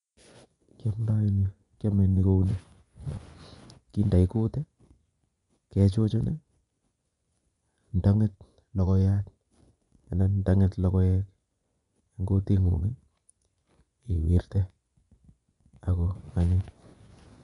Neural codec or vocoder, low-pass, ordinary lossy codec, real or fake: vocoder, 24 kHz, 100 mel bands, Vocos; 10.8 kHz; none; fake